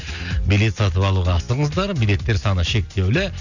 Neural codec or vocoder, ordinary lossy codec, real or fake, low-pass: none; none; real; 7.2 kHz